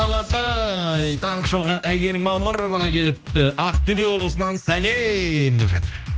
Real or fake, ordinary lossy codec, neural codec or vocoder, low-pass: fake; none; codec, 16 kHz, 1 kbps, X-Codec, HuBERT features, trained on general audio; none